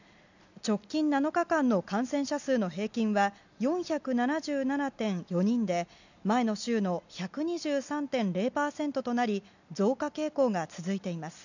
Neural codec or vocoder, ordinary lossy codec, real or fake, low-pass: none; none; real; 7.2 kHz